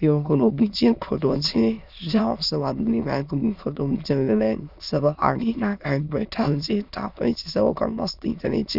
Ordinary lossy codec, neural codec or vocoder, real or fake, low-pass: none; autoencoder, 22.05 kHz, a latent of 192 numbers a frame, VITS, trained on many speakers; fake; 5.4 kHz